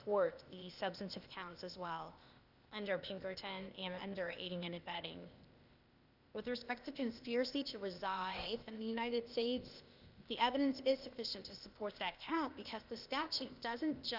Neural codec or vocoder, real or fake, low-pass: codec, 16 kHz, 0.8 kbps, ZipCodec; fake; 5.4 kHz